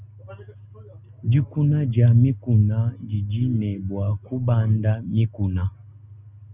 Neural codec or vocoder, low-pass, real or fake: none; 3.6 kHz; real